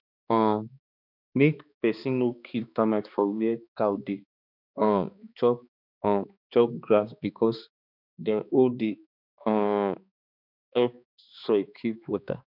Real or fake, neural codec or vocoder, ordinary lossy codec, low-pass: fake; codec, 16 kHz, 2 kbps, X-Codec, HuBERT features, trained on balanced general audio; none; 5.4 kHz